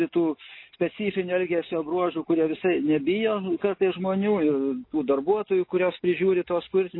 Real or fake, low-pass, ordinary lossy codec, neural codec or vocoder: real; 5.4 kHz; MP3, 24 kbps; none